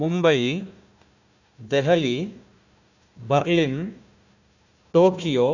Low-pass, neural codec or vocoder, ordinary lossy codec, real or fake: 7.2 kHz; codec, 16 kHz, 1 kbps, FunCodec, trained on Chinese and English, 50 frames a second; none; fake